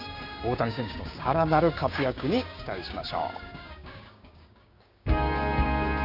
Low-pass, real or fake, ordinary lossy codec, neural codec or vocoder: 5.4 kHz; fake; none; codec, 16 kHz, 6 kbps, DAC